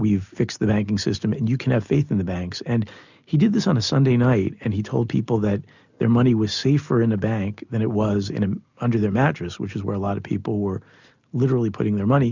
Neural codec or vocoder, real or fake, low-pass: none; real; 7.2 kHz